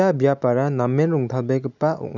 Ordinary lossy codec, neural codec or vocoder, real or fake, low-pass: none; none; real; 7.2 kHz